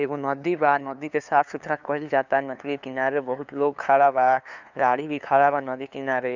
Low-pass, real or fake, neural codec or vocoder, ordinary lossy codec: 7.2 kHz; fake; codec, 16 kHz, 2 kbps, FunCodec, trained on LibriTTS, 25 frames a second; none